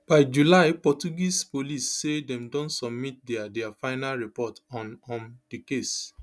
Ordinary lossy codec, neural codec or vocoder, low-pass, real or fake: none; none; none; real